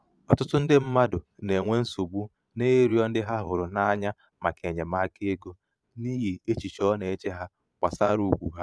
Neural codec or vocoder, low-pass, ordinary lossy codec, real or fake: vocoder, 22.05 kHz, 80 mel bands, Vocos; none; none; fake